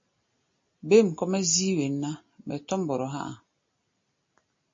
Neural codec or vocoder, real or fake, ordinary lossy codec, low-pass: none; real; MP3, 32 kbps; 7.2 kHz